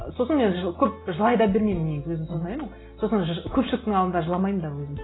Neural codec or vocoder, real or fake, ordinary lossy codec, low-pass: none; real; AAC, 16 kbps; 7.2 kHz